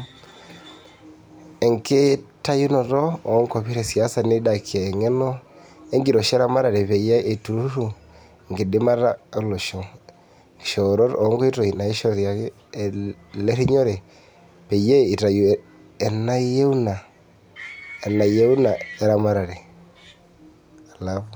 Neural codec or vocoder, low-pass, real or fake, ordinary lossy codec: none; none; real; none